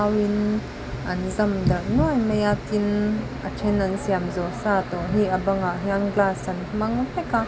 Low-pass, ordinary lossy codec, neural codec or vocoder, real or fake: none; none; none; real